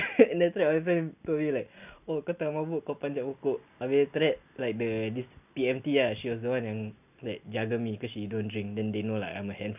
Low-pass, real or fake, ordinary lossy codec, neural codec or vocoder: 3.6 kHz; real; none; none